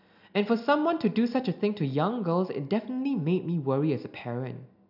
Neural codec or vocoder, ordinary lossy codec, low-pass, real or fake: none; none; 5.4 kHz; real